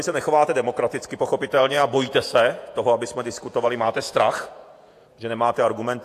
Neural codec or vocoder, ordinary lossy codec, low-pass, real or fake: vocoder, 48 kHz, 128 mel bands, Vocos; AAC, 64 kbps; 14.4 kHz; fake